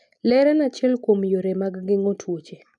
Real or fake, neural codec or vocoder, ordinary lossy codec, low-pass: real; none; none; none